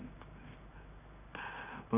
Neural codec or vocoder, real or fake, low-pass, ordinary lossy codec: codec, 16 kHz in and 24 kHz out, 1 kbps, XY-Tokenizer; fake; 3.6 kHz; none